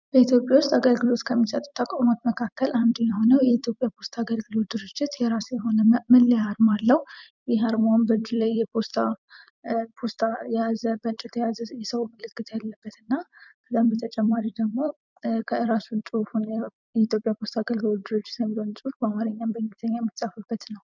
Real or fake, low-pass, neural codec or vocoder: fake; 7.2 kHz; vocoder, 44.1 kHz, 128 mel bands every 256 samples, BigVGAN v2